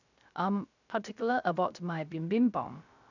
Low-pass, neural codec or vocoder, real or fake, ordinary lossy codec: 7.2 kHz; codec, 16 kHz, 0.3 kbps, FocalCodec; fake; none